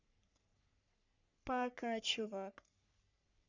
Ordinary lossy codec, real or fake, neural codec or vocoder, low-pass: none; fake; codec, 44.1 kHz, 3.4 kbps, Pupu-Codec; 7.2 kHz